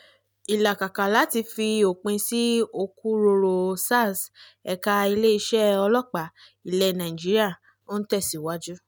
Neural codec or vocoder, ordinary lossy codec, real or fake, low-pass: none; none; real; none